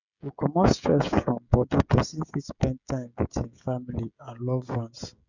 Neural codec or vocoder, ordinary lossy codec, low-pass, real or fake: codec, 16 kHz, 16 kbps, FreqCodec, smaller model; none; 7.2 kHz; fake